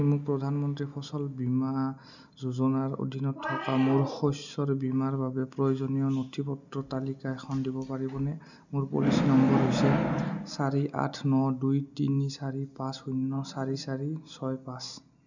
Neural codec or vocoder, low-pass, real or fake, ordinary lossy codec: none; 7.2 kHz; real; none